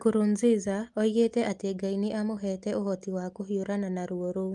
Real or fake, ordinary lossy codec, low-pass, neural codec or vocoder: real; Opus, 32 kbps; 10.8 kHz; none